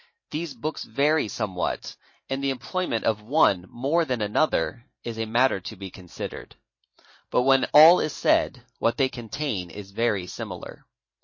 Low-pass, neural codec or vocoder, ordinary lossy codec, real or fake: 7.2 kHz; vocoder, 44.1 kHz, 128 mel bands every 512 samples, BigVGAN v2; MP3, 32 kbps; fake